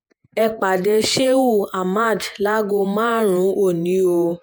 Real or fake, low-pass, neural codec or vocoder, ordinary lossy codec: fake; none; vocoder, 48 kHz, 128 mel bands, Vocos; none